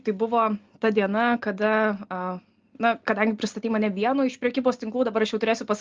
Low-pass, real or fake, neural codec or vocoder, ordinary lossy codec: 7.2 kHz; real; none; Opus, 32 kbps